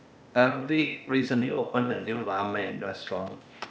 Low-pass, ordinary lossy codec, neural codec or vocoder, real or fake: none; none; codec, 16 kHz, 0.8 kbps, ZipCodec; fake